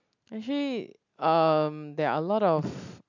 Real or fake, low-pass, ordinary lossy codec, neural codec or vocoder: real; 7.2 kHz; none; none